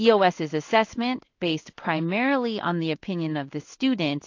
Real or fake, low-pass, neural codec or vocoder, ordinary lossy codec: fake; 7.2 kHz; codec, 16 kHz in and 24 kHz out, 1 kbps, XY-Tokenizer; AAC, 48 kbps